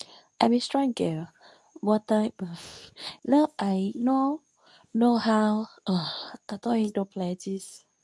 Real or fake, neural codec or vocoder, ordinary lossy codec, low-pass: fake; codec, 24 kHz, 0.9 kbps, WavTokenizer, medium speech release version 2; none; none